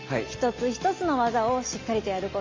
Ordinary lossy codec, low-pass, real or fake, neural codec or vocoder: Opus, 32 kbps; 7.2 kHz; real; none